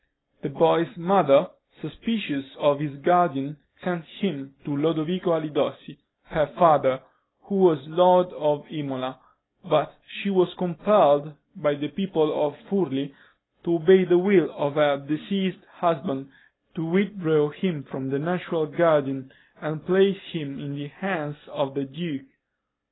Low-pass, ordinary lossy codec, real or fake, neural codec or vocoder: 7.2 kHz; AAC, 16 kbps; real; none